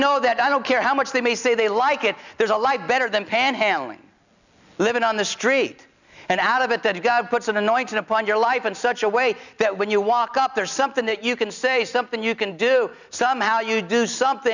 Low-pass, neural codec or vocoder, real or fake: 7.2 kHz; none; real